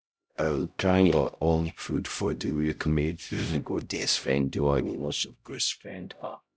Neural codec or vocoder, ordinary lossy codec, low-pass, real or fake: codec, 16 kHz, 0.5 kbps, X-Codec, HuBERT features, trained on LibriSpeech; none; none; fake